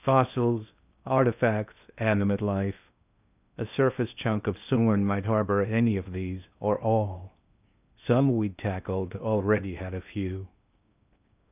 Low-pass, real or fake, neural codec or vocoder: 3.6 kHz; fake; codec, 16 kHz in and 24 kHz out, 0.8 kbps, FocalCodec, streaming, 65536 codes